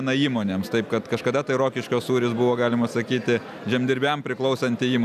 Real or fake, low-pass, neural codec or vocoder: real; 14.4 kHz; none